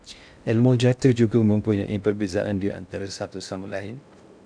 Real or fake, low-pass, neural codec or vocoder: fake; 9.9 kHz; codec, 16 kHz in and 24 kHz out, 0.6 kbps, FocalCodec, streaming, 2048 codes